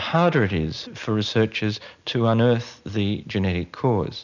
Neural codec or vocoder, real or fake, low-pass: none; real; 7.2 kHz